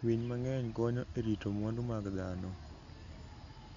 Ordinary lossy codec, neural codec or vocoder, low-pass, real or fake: MP3, 64 kbps; none; 7.2 kHz; real